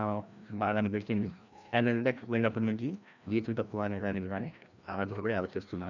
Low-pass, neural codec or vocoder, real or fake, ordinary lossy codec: 7.2 kHz; codec, 16 kHz, 1 kbps, FreqCodec, larger model; fake; none